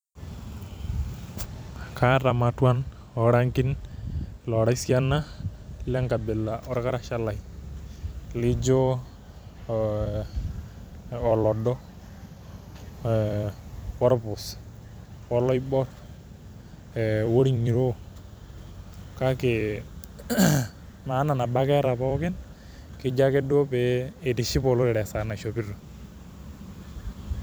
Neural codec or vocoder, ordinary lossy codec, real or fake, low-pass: vocoder, 44.1 kHz, 128 mel bands every 256 samples, BigVGAN v2; none; fake; none